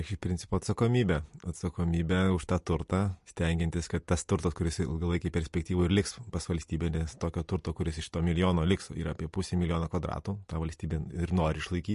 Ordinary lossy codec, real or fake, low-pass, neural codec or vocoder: MP3, 48 kbps; real; 14.4 kHz; none